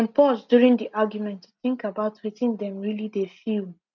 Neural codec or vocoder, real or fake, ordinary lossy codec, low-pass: none; real; none; 7.2 kHz